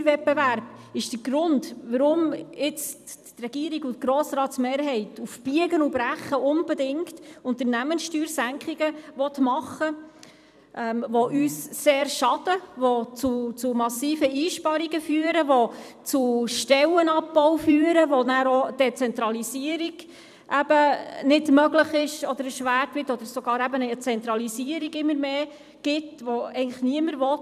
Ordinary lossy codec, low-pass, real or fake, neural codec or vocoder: none; 14.4 kHz; fake; vocoder, 44.1 kHz, 128 mel bands every 256 samples, BigVGAN v2